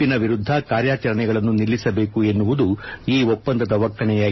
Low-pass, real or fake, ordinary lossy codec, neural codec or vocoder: 7.2 kHz; real; MP3, 24 kbps; none